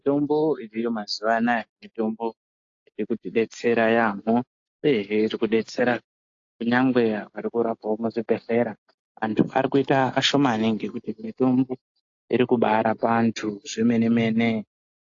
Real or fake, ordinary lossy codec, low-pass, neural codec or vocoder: real; AAC, 48 kbps; 7.2 kHz; none